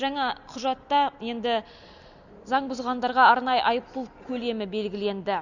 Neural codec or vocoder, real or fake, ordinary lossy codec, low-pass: none; real; none; 7.2 kHz